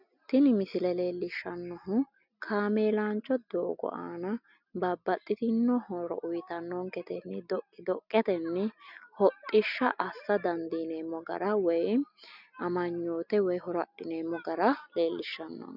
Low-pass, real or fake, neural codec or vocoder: 5.4 kHz; real; none